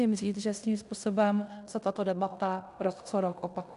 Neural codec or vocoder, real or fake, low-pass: codec, 16 kHz in and 24 kHz out, 0.9 kbps, LongCat-Audio-Codec, fine tuned four codebook decoder; fake; 10.8 kHz